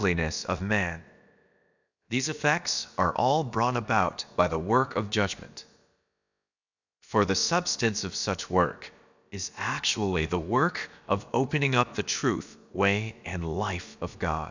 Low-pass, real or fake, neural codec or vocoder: 7.2 kHz; fake; codec, 16 kHz, about 1 kbps, DyCAST, with the encoder's durations